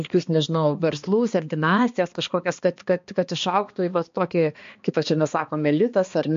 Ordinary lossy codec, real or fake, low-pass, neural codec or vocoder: MP3, 48 kbps; fake; 7.2 kHz; codec, 16 kHz, 2 kbps, X-Codec, HuBERT features, trained on balanced general audio